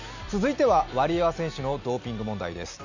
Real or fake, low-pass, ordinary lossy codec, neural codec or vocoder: real; 7.2 kHz; none; none